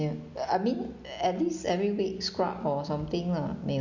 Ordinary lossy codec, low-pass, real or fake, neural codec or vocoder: Opus, 64 kbps; 7.2 kHz; real; none